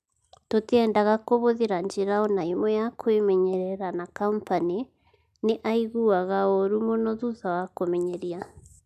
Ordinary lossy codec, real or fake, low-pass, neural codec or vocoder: none; real; 14.4 kHz; none